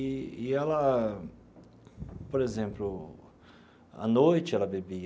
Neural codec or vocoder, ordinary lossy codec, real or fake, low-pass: none; none; real; none